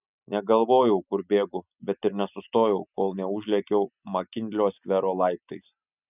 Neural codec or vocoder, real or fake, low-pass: none; real; 3.6 kHz